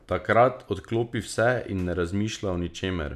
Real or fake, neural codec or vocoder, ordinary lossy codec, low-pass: real; none; none; 14.4 kHz